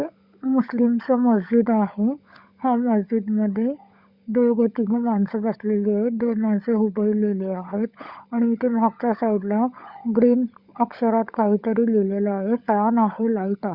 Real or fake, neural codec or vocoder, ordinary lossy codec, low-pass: fake; codec, 16 kHz, 16 kbps, FunCodec, trained on LibriTTS, 50 frames a second; Opus, 64 kbps; 5.4 kHz